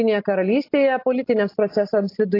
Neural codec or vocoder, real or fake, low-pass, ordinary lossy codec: none; real; 5.4 kHz; AAC, 32 kbps